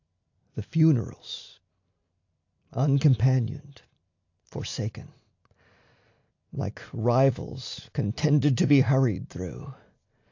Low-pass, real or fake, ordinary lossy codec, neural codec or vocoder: 7.2 kHz; real; AAC, 48 kbps; none